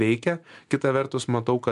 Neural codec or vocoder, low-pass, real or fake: none; 10.8 kHz; real